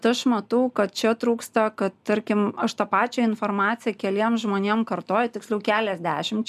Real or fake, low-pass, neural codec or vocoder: real; 14.4 kHz; none